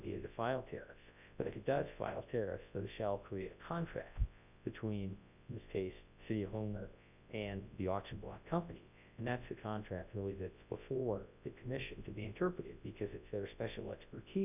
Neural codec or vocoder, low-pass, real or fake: codec, 24 kHz, 0.9 kbps, WavTokenizer, large speech release; 3.6 kHz; fake